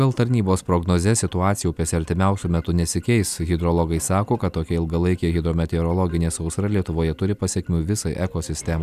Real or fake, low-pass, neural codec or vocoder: real; 14.4 kHz; none